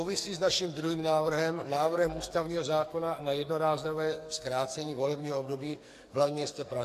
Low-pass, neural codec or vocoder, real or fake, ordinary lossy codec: 14.4 kHz; codec, 44.1 kHz, 2.6 kbps, SNAC; fake; AAC, 64 kbps